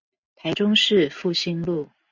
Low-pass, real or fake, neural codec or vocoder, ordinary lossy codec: 7.2 kHz; real; none; MP3, 64 kbps